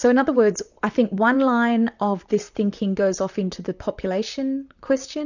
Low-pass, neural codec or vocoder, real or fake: 7.2 kHz; vocoder, 44.1 kHz, 128 mel bands, Pupu-Vocoder; fake